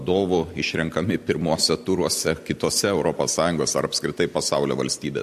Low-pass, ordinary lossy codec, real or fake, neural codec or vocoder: 14.4 kHz; MP3, 64 kbps; real; none